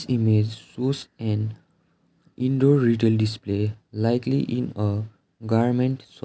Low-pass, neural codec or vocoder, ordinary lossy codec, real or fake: none; none; none; real